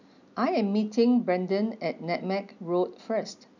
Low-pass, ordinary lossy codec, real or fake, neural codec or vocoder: 7.2 kHz; none; real; none